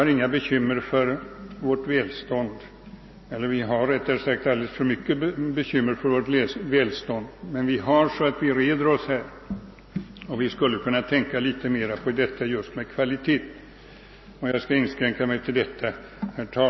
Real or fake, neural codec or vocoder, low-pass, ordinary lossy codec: real; none; 7.2 kHz; MP3, 24 kbps